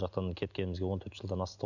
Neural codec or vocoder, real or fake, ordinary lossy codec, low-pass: none; real; none; 7.2 kHz